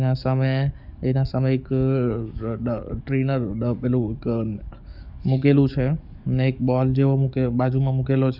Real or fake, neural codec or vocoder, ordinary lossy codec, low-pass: fake; codec, 44.1 kHz, 7.8 kbps, DAC; none; 5.4 kHz